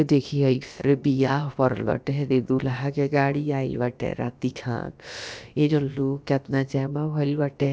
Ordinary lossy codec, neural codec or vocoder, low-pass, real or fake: none; codec, 16 kHz, about 1 kbps, DyCAST, with the encoder's durations; none; fake